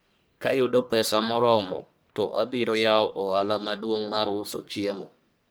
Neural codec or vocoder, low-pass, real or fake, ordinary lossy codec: codec, 44.1 kHz, 1.7 kbps, Pupu-Codec; none; fake; none